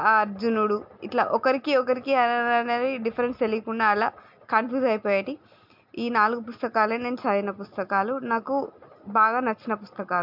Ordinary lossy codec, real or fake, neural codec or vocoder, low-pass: MP3, 48 kbps; real; none; 5.4 kHz